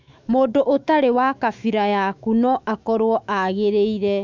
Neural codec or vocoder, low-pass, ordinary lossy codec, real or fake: autoencoder, 48 kHz, 128 numbers a frame, DAC-VAE, trained on Japanese speech; 7.2 kHz; MP3, 64 kbps; fake